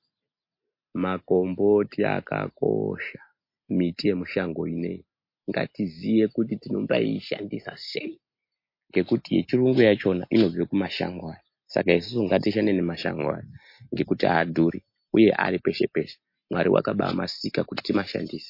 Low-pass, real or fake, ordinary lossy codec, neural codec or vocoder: 5.4 kHz; real; MP3, 32 kbps; none